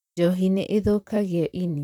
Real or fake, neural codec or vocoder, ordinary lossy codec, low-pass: fake; vocoder, 44.1 kHz, 128 mel bands, Pupu-Vocoder; none; 19.8 kHz